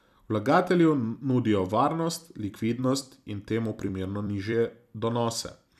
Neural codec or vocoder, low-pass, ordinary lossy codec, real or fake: vocoder, 44.1 kHz, 128 mel bands every 256 samples, BigVGAN v2; 14.4 kHz; none; fake